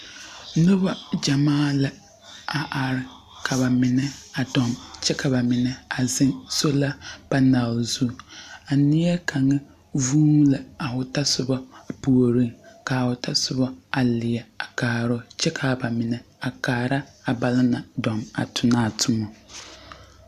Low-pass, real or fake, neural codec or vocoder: 14.4 kHz; real; none